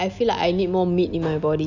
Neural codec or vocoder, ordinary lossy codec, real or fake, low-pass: none; none; real; 7.2 kHz